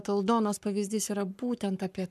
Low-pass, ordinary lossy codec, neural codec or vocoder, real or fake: 14.4 kHz; MP3, 96 kbps; codec, 44.1 kHz, 7.8 kbps, Pupu-Codec; fake